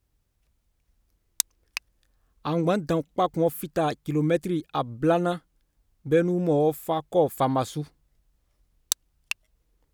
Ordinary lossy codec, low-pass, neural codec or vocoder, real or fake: none; none; none; real